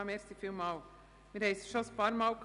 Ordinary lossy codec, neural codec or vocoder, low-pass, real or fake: none; none; 10.8 kHz; real